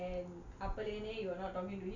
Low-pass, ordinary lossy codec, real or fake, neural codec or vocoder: 7.2 kHz; none; real; none